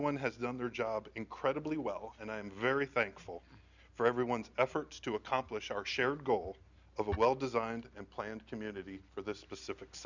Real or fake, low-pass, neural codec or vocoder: real; 7.2 kHz; none